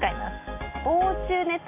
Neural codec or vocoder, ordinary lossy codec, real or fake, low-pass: none; AAC, 24 kbps; real; 3.6 kHz